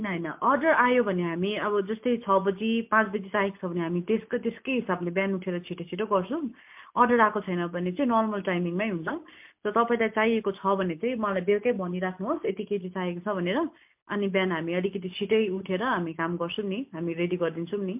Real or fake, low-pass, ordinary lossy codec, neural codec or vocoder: real; 3.6 kHz; MP3, 32 kbps; none